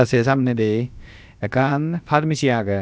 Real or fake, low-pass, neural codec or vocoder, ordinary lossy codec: fake; none; codec, 16 kHz, about 1 kbps, DyCAST, with the encoder's durations; none